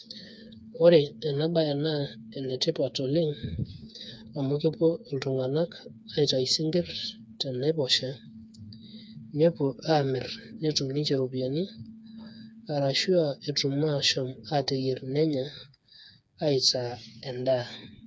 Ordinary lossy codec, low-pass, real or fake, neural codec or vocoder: none; none; fake; codec, 16 kHz, 4 kbps, FreqCodec, smaller model